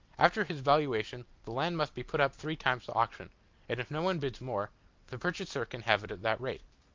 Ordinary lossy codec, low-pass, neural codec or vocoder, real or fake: Opus, 24 kbps; 7.2 kHz; none; real